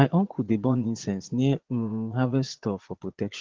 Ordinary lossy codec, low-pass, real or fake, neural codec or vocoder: Opus, 16 kbps; 7.2 kHz; fake; vocoder, 22.05 kHz, 80 mel bands, Vocos